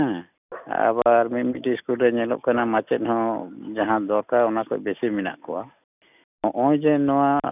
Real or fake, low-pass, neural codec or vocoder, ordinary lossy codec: real; 3.6 kHz; none; none